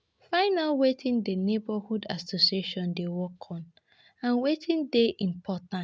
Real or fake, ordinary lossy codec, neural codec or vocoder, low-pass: real; none; none; none